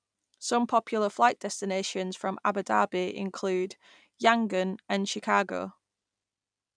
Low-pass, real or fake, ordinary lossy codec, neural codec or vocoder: 9.9 kHz; real; none; none